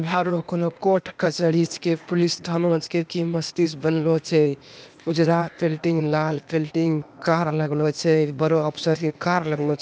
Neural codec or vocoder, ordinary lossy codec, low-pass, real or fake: codec, 16 kHz, 0.8 kbps, ZipCodec; none; none; fake